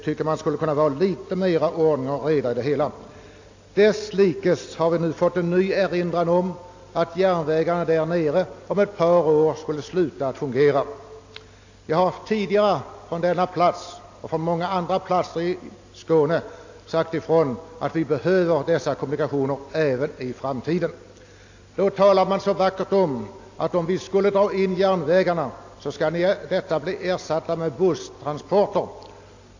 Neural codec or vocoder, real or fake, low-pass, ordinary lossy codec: none; real; 7.2 kHz; AAC, 48 kbps